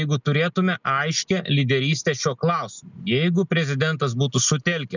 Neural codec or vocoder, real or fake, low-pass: none; real; 7.2 kHz